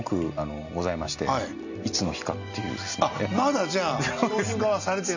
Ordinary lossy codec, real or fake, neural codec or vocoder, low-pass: none; real; none; 7.2 kHz